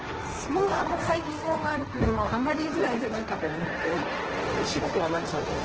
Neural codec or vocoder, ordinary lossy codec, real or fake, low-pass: codec, 16 kHz, 1.1 kbps, Voila-Tokenizer; Opus, 16 kbps; fake; 7.2 kHz